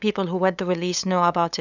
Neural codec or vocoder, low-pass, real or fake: codec, 16 kHz, 8 kbps, FunCodec, trained on LibriTTS, 25 frames a second; 7.2 kHz; fake